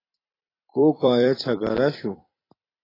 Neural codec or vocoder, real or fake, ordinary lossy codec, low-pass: vocoder, 44.1 kHz, 128 mel bands every 256 samples, BigVGAN v2; fake; AAC, 24 kbps; 5.4 kHz